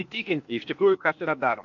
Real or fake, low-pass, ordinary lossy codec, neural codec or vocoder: fake; 7.2 kHz; MP3, 48 kbps; codec, 16 kHz, 0.8 kbps, ZipCodec